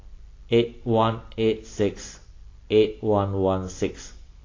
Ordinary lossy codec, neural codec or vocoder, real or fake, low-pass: AAC, 32 kbps; none; real; 7.2 kHz